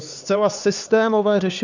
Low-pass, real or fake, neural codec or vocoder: 7.2 kHz; fake; codec, 16 kHz, 4 kbps, X-Codec, HuBERT features, trained on LibriSpeech